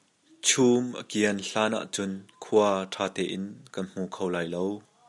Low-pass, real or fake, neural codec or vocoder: 10.8 kHz; real; none